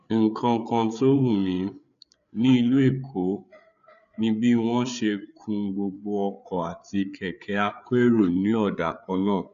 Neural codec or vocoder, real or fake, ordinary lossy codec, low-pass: codec, 16 kHz, 8 kbps, FreqCodec, larger model; fake; none; 7.2 kHz